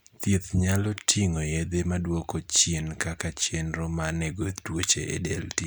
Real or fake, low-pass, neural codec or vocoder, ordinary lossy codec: real; none; none; none